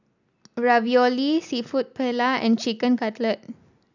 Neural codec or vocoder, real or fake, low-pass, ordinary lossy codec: none; real; 7.2 kHz; none